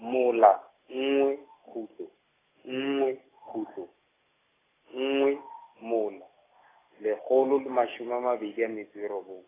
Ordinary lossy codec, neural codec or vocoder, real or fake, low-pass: AAC, 16 kbps; none; real; 3.6 kHz